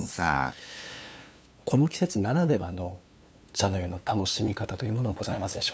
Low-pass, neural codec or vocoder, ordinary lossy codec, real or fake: none; codec, 16 kHz, 2 kbps, FunCodec, trained on LibriTTS, 25 frames a second; none; fake